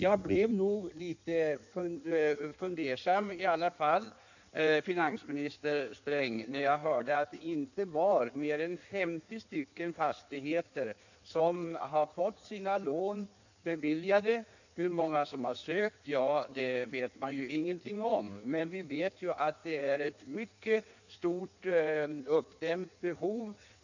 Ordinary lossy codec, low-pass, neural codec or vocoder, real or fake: none; 7.2 kHz; codec, 16 kHz in and 24 kHz out, 1.1 kbps, FireRedTTS-2 codec; fake